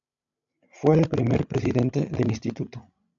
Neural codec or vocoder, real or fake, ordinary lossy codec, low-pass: codec, 16 kHz, 16 kbps, FreqCodec, larger model; fake; AAC, 64 kbps; 7.2 kHz